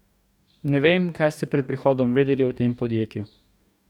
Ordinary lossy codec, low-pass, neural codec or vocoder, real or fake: none; 19.8 kHz; codec, 44.1 kHz, 2.6 kbps, DAC; fake